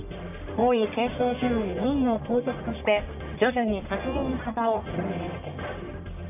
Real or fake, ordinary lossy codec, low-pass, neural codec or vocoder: fake; none; 3.6 kHz; codec, 44.1 kHz, 1.7 kbps, Pupu-Codec